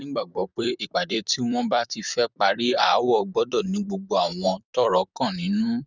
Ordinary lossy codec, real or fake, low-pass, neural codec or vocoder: none; fake; 7.2 kHz; vocoder, 44.1 kHz, 128 mel bands, Pupu-Vocoder